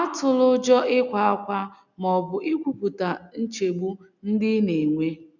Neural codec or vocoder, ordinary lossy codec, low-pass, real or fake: none; none; 7.2 kHz; real